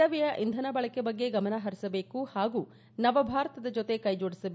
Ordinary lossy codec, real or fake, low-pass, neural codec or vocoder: none; real; none; none